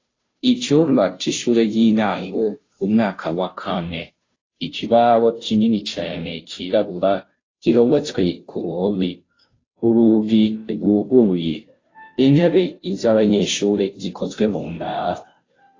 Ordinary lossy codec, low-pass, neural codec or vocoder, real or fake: AAC, 32 kbps; 7.2 kHz; codec, 16 kHz, 0.5 kbps, FunCodec, trained on Chinese and English, 25 frames a second; fake